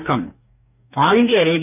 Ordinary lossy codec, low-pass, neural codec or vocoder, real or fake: none; 3.6 kHz; codec, 44.1 kHz, 2.6 kbps, DAC; fake